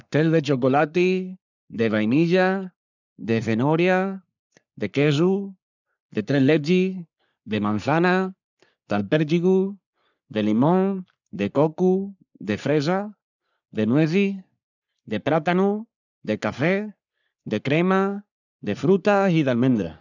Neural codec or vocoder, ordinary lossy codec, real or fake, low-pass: codec, 44.1 kHz, 3.4 kbps, Pupu-Codec; none; fake; 7.2 kHz